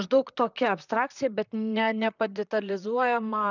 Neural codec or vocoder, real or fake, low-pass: vocoder, 44.1 kHz, 128 mel bands, Pupu-Vocoder; fake; 7.2 kHz